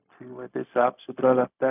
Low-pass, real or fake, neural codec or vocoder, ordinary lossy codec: 3.6 kHz; fake; codec, 16 kHz, 0.4 kbps, LongCat-Audio-Codec; none